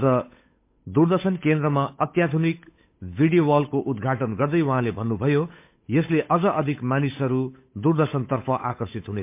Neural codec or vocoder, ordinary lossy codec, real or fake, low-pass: codec, 16 kHz, 8 kbps, FunCodec, trained on Chinese and English, 25 frames a second; MP3, 32 kbps; fake; 3.6 kHz